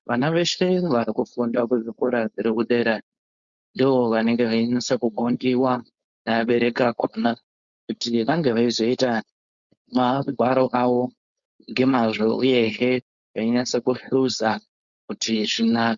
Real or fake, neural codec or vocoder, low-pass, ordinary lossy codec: fake; codec, 16 kHz, 4.8 kbps, FACodec; 7.2 kHz; Opus, 64 kbps